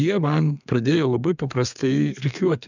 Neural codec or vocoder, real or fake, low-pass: codec, 32 kHz, 1.9 kbps, SNAC; fake; 7.2 kHz